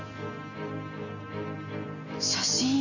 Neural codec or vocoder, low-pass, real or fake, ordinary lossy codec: none; 7.2 kHz; real; none